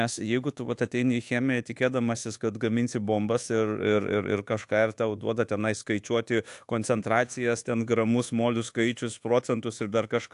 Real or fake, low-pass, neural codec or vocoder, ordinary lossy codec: fake; 10.8 kHz; codec, 24 kHz, 1.2 kbps, DualCodec; AAC, 64 kbps